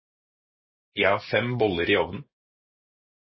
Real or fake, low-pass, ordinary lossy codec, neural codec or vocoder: real; 7.2 kHz; MP3, 24 kbps; none